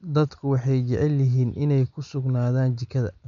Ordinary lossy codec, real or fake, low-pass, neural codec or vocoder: none; real; 7.2 kHz; none